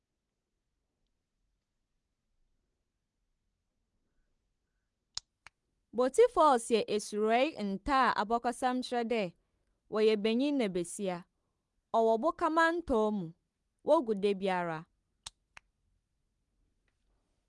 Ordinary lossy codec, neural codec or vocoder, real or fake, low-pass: Opus, 24 kbps; none; real; 10.8 kHz